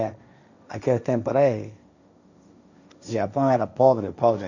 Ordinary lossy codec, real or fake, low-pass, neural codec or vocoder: none; fake; 7.2 kHz; codec, 16 kHz, 1.1 kbps, Voila-Tokenizer